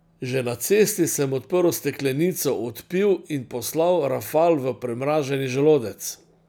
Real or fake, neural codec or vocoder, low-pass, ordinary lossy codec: real; none; none; none